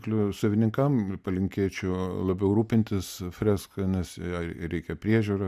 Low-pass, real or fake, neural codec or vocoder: 14.4 kHz; real; none